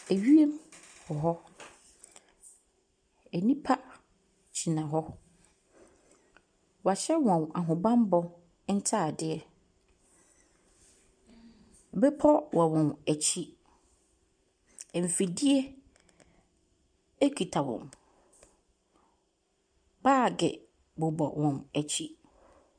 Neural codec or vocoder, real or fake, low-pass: none; real; 9.9 kHz